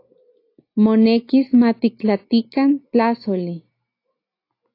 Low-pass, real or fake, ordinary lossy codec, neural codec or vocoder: 5.4 kHz; real; AAC, 32 kbps; none